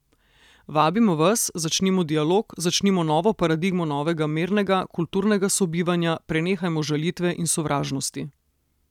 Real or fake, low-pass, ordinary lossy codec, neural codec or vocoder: real; 19.8 kHz; none; none